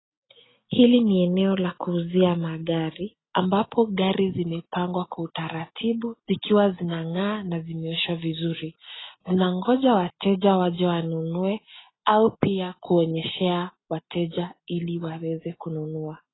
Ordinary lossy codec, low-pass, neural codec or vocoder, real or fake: AAC, 16 kbps; 7.2 kHz; none; real